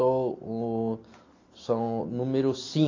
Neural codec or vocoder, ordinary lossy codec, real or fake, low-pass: none; AAC, 32 kbps; real; 7.2 kHz